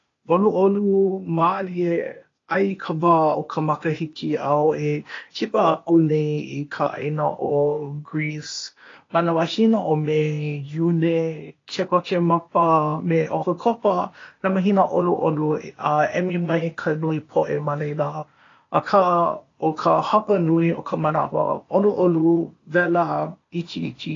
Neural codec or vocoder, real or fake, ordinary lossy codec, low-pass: codec, 16 kHz, 0.8 kbps, ZipCodec; fake; AAC, 32 kbps; 7.2 kHz